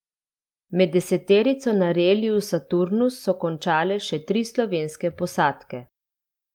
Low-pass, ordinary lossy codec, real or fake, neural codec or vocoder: 19.8 kHz; Opus, 32 kbps; real; none